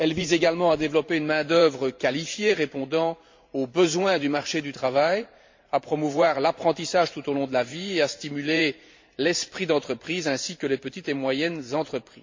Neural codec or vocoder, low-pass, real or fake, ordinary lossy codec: vocoder, 44.1 kHz, 128 mel bands every 512 samples, BigVGAN v2; 7.2 kHz; fake; MP3, 48 kbps